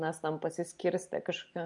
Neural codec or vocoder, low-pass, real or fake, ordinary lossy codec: none; 10.8 kHz; real; MP3, 64 kbps